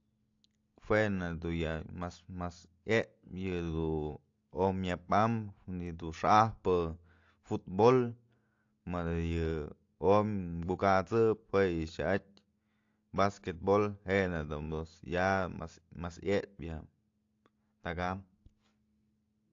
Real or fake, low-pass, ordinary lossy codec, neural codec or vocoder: real; 7.2 kHz; AAC, 48 kbps; none